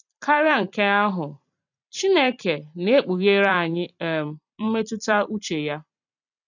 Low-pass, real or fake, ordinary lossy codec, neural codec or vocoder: 7.2 kHz; fake; none; vocoder, 44.1 kHz, 128 mel bands every 256 samples, BigVGAN v2